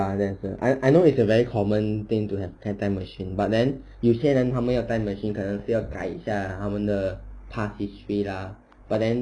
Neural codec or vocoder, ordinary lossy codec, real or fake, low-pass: none; none; real; 9.9 kHz